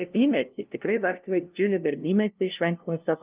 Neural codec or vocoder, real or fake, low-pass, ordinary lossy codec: codec, 16 kHz, 0.5 kbps, FunCodec, trained on LibriTTS, 25 frames a second; fake; 3.6 kHz; Opus, 32 kbps